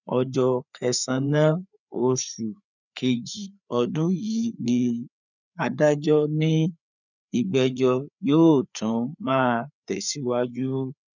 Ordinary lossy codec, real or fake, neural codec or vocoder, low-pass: none; fake; codec, 16 kHz, 4 kbps, FreqCodec, larger model; 7.2 kHz